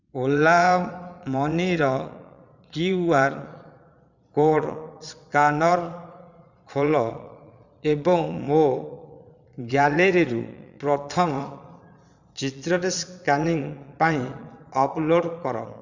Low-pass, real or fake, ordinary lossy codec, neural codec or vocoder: 7.2 kHz; fake; none; vocoder, 22.05 kHz, 80 mel bands, Vocos